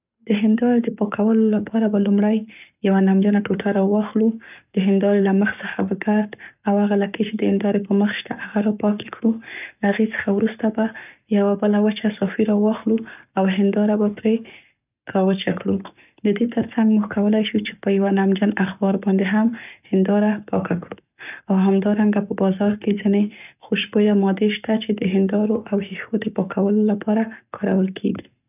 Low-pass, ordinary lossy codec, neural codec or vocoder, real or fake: 3.6 kHz; none; codec, 44.1 kHz, 7.8 kbps, Pupu-Codec; fake